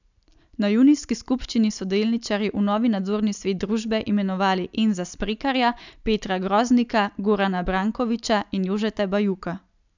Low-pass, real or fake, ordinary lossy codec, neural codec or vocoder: 7.2 kHz; real; none; none